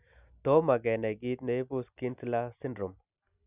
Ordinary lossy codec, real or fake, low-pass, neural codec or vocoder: none; real; 3.6 kHz; none